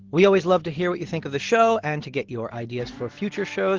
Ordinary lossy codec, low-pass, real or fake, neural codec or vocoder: Opus, 16 kbps; 7.2 kHz; real; none